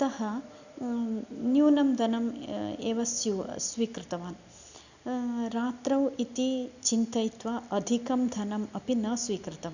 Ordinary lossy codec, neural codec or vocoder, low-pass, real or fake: none; none; 7.2 kHz; real